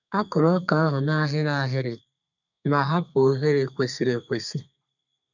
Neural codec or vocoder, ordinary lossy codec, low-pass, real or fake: codec, 32 kHz, 1.9 kbps, SNAC; none; 7.2 kHz; fake